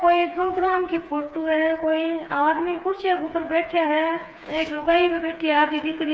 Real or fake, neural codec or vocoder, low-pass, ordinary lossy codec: fake; codec, 16 kHz, 4 kbps, FreqCodec, smaller model; none; none